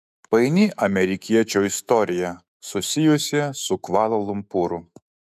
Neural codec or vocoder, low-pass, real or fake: autoencoder, 48 kHz, 128 numbers a frame, DAC-VAE, trained on Japanese speech; 14.4 kHz; fake